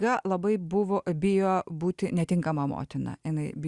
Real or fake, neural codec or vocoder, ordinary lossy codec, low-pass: real; none; Opus, 64 kbps; 10.8 kHz